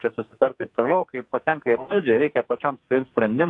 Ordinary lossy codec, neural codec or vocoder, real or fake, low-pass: AAC, 48 kbps; codec, 44.1 kHz, 2.6 kbps, SNAC; fake; 10.8 kHz